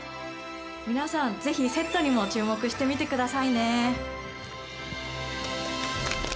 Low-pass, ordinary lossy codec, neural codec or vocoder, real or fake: none; none; none; real